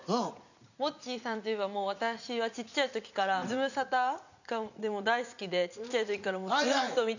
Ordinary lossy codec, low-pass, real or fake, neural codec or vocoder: none; 7.2 kHz; fake; vocoder, 44.1 kHz, 80 mel bands, Vocos